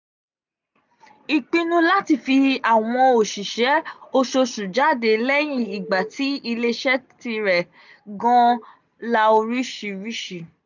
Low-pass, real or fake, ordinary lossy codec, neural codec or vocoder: 7.2 kHz; real; none; none